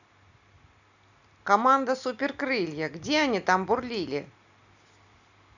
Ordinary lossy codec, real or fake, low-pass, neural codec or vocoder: none; real; 7.2 kHz; none